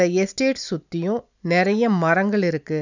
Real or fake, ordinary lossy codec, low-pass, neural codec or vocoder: real; none; 7.2 kHz; none